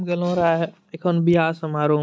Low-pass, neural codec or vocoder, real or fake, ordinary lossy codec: none; none; real; none